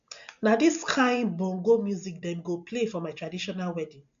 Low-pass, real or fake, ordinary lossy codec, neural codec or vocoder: 7.2 kHz; real; AAC, 64 kbps; none